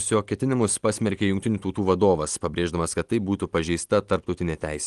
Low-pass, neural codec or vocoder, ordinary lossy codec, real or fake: 10.8 kHz; none; Opus, 24 kbps; real